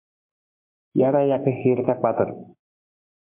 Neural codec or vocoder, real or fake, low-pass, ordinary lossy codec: codec, 44.1 kHz, 7.8 kbps, Pupu-Codec; fake; 3.6 kHz; MP3, 32 kbps